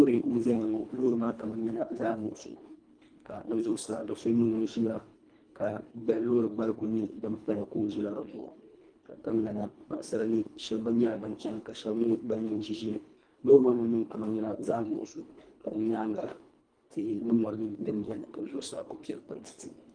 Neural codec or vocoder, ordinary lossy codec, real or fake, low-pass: codec, 24 kHz, 1.5 kbps, HILCodec; Opus, 32 kbps; fake; 9.9 kHz